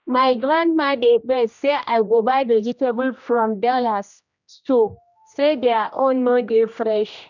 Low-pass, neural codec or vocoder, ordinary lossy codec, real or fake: 7.2 kHz; codec, 16 kHz, 1 kbps, X-Codec, HuBERT features, trained on general audio; none; fake